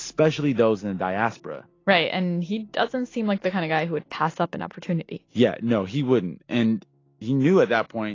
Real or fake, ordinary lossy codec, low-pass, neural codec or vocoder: real; AAC, 32 kbps; 7.2 kHz; none